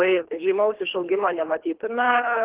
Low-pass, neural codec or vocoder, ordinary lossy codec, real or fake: 3.6 kHz; codec, 24 kHz, 3 kbps, HILCodec; Opus, 16 kbps; fake